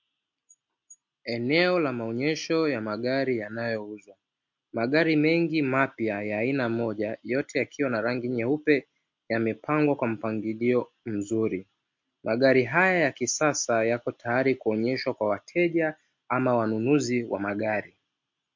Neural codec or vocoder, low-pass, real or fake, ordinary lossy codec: none; 7.2 kHz; real; MP3, 48 kbps